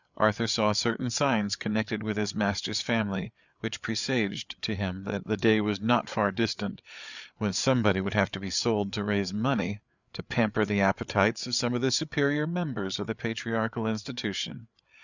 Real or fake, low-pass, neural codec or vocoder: fake; 7.2 kHz; codec, 16 kHz, 8 kbps, FreqCodec, larger model